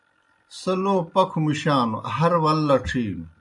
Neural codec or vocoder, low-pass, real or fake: none; 10.8 kHz; real